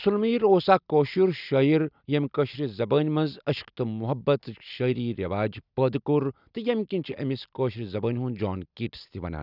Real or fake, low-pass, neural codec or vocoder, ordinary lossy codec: real; 5.4 kHz; none; none